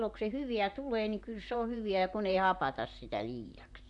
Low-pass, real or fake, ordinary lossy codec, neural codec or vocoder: 10.8 kHz; fake; none; vocoder, 44.1 kHz, 128 mel bands, Pupu-Vocoder